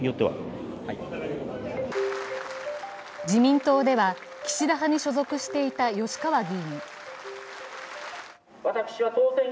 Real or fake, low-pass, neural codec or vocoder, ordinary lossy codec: real; none; none; none